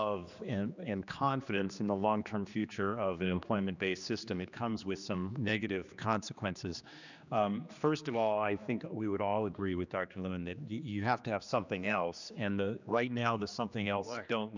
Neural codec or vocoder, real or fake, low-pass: codec, 16 kHz, 2 kbps, X-Codec, HuBERT features, trained on general audio; fake; 7.2 kHz